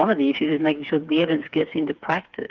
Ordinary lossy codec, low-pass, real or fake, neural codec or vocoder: Opus, 32 kbps; 7.2 kHz; fake; codec, 16 kHz, 4 kbps, FreqCodec, smaller model